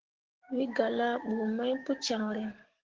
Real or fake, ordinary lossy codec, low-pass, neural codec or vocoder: fake; Opus, 16 kbps; 7.2 kHz; codec, 16 kHz, 6 kbps, DAC